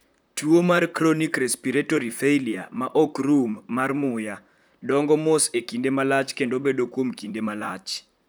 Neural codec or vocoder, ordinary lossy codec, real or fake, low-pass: vocoder, 44.1 kHz, 128 mel bands, Pupu-Vocoder; none; fake; none